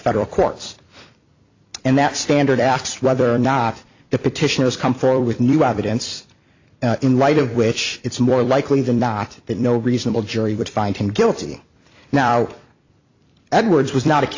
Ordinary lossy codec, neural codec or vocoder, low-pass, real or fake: AAC, 48 kbps; vocoder, 44.1 kHz, 128 mel bands every 256 samples, BigVGAN v2; 7.2 kHz; fake